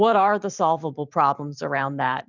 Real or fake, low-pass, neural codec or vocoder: fake; 7.2 kHz; vocoder, 44.1 kHz, 128 mel bands every 512 samples, BigVGAN v2